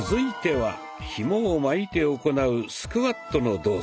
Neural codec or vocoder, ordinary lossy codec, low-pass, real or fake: none; none; none; real